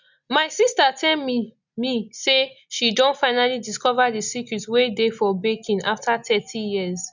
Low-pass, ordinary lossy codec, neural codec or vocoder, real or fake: 7.2 kHz; none; none; real